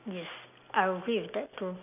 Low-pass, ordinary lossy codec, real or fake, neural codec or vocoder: 3.6 kHz; none; real; none